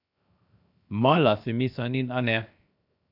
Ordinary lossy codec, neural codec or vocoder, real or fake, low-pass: none; codec, 16 kHz, 0.7 kbps, FocalCodec; fake; 5.4 kHz